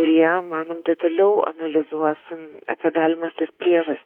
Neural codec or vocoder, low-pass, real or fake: autoencoder, 48 kHz, 32 numbers a frame, DAC-VAE, trained on Japanese speech; 19.8 kHz; fake